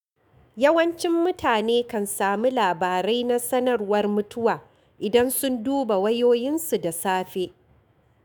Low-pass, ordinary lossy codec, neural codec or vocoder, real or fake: none; none; autoencoder, 48 kHz, 128 numbers a frame, DAC-VAE, trained on Japanese speech; fake